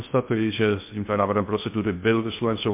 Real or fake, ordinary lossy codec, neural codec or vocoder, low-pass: fake; MP3, 32 kbps; codec, 16 kHz in and 24 kHz out, 0.6 kbps, FocalCodec, streaming, 2048 codes; 3.6 kHz